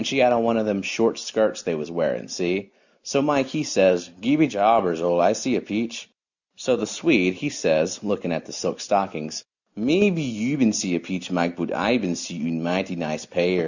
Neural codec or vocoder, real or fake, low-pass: none; real; 7.2 kHz